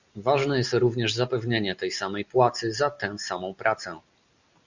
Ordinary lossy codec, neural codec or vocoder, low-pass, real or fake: Opus, 64 kbps; none; 7.2 kHz; real